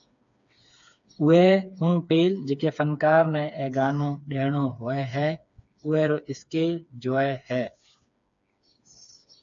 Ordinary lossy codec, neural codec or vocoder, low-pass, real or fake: AAC, 64 kbps; codec, 16 kHz, 4 kbps, FreqCodec, smaller model; 7.2 kHz; fake